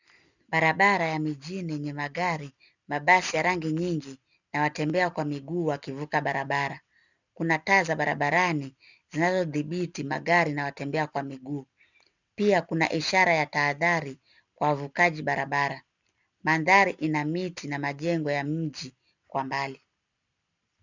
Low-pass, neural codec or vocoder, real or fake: 7.2 kHz; none; real